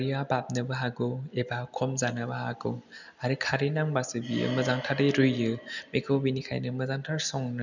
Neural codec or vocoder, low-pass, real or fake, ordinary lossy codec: none; 7.2 kHz; real; none